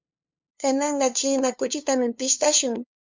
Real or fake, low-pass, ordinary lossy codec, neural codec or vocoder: fake; 7.2 kHz; MP3, 64 kbps; codec, 16 kHz, 2 kbps, FunCodec, trained on LibriTTS, 25 frames a second